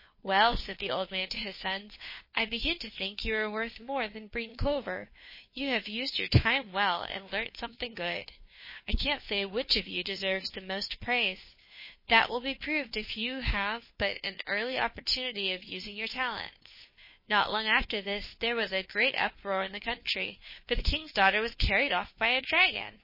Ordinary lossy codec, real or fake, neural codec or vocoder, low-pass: MP3, 24 kbps; fake; codec, 16 kHz, 2 kbps, FunCodec, trained on Chinese and English, 25 frames a second; 5.4 kHz